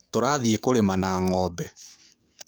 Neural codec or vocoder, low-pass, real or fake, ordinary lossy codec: codec, 44.1 kHz, 7.8 kbps, DAC; none; fake; none